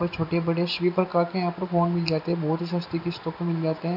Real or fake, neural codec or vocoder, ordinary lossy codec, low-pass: real; none; none; 5.4 kHz